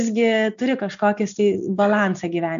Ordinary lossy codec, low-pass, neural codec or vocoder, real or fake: AAC, 96 kbps; 7.2 kHz; none; real